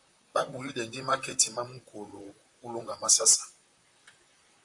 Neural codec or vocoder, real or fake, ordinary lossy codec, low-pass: vocoder, 44.1 kHz, 128 mel bands, Pupu-Vocoder; fake; AAC, 64 kbps; 10.8 kHz